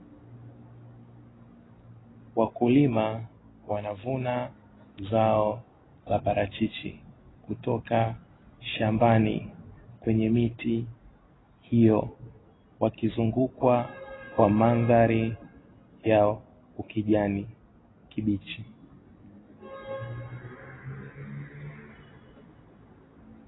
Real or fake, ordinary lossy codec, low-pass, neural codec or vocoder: real; AAC, 16 kbps; 7.2 kHz; none